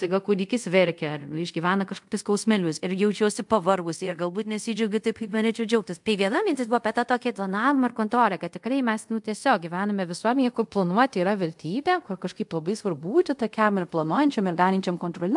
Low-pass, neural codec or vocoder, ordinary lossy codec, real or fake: 10.8 kHz; codec, 24 kHz, 0.5 kbps, DualCodec; MP3, 64 kbps; fake